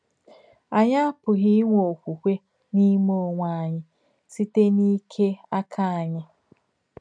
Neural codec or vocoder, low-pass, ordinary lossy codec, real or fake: none; 9.9 kHz; none; real